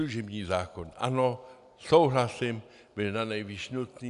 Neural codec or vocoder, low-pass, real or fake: none; 10.8 kHz; real